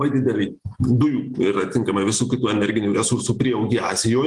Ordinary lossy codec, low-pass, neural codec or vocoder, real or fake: Opus, 24 kbps; 10.8 kHz; none; real